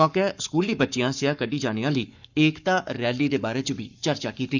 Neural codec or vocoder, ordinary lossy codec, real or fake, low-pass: codec, 44.1 kHz, 7.8 kbps, Pupu-Codec; none; fake; 7.2 kHz